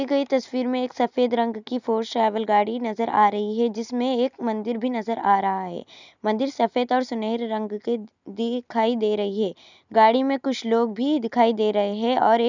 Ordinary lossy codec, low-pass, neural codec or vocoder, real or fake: none; 7.2 kHz; none; real